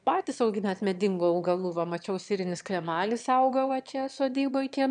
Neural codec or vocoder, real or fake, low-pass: autoencoder, 22.05 kHz, a latent of 192 numbers a frame, VITS, trained on one speaker; fake; 9.9 kHz